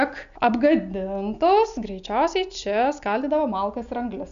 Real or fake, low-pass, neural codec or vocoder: real; 7.2 kHz; none